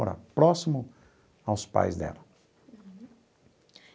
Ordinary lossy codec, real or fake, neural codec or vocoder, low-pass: none; real; none; none